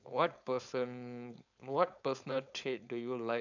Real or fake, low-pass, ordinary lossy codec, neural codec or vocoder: fake; 7.2 kHz; none; codec, 16 kHz, 4.8 kbps, FACodec